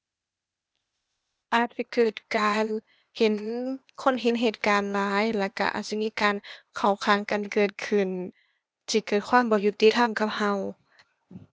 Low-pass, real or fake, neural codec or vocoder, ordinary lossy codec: none; fake; codec, 16 kHz, 0.8 kbps, ZipCodec; none